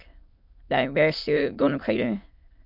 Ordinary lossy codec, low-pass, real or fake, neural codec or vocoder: MP3, 48 kbps; 5.4 kHz; fake; autoencoder, 22.05 kHz, a latent of 192 numbers a frame, VITS, trained on many speakers